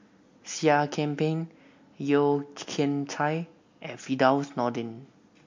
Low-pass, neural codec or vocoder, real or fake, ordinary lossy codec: 7.2 kHz; none; real; MP3, 48 kbps